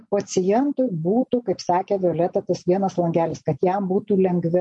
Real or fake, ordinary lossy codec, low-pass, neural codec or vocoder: real; MP3, 48 kbps; 10.8 kHz; none